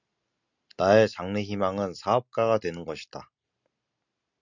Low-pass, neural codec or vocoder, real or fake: 7.2 kHz; none; real